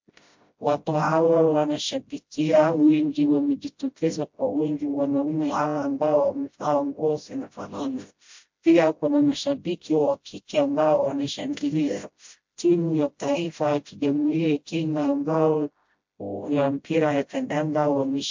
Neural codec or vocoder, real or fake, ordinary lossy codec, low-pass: codec, 16 kHz, 0.5 kbps, FreqCodec, smaller model; fake; MP3, 48 kbps; 7.2 kHz